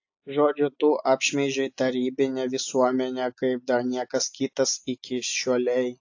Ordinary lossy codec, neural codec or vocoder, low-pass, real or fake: AAC, 48 kbps; none; 7.2 kHz; real